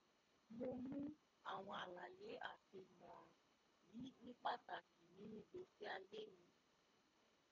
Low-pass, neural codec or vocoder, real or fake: 7.2 kHz; codec, 24 kHz, 3 kbps, HILCodec; fake